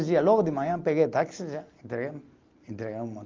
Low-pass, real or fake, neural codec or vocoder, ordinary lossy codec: 7.2 kHz; real; none; Opus, 24 kbps